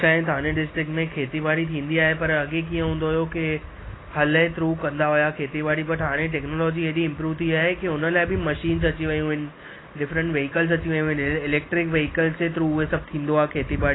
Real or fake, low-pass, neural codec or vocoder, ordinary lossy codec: real; 7.2 kHz; none; AAC, 16 kbps